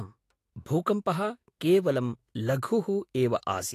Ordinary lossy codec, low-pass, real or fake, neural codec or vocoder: AAC, 48 kbps; 14.4 kHz; fake; autoencoder, 48 kHz, 128 numbers a frame, DAC-VAE, trained on Japanese speech